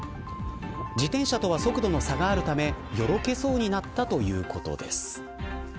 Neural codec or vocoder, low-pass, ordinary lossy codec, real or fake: none; none; none; real